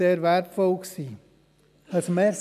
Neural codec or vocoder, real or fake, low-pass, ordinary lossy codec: none; real; 14.4 kHz; none